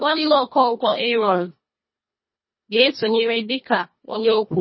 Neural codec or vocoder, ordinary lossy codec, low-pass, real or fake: codec, 24 kHz, 1.5 kbps, HILCodec; MP3, 24 kbps; 7.2 kHz; fake